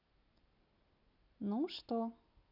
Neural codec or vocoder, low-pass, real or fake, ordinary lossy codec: none; 5.4 kHz; real; none